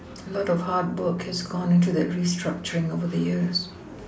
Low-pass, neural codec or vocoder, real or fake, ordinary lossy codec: none; none; real; none